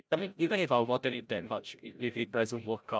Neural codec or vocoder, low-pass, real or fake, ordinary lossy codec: codec, 16 kHz, 0.5 kbps, FreqCodec, larger model; none; fake; none